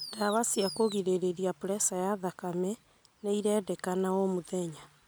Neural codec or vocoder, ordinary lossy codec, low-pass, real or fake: none; none; none; real